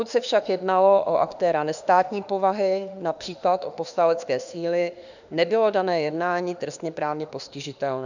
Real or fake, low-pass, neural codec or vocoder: fake; 7.2 kHz; autoencoder, 48 kHz, 32 numbers a frame, DAC-VAE, trained on Japanese speech